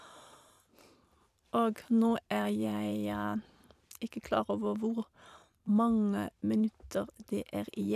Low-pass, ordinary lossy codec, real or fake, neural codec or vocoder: 14.4 kHz; none; fake; vocoder, 44.1 kHz, 128 mel bands every 512 samples, BigVGAN v2